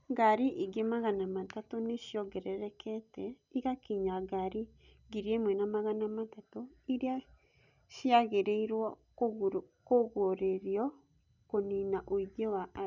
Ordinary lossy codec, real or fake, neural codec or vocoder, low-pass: none; real; none; 7.2 kHz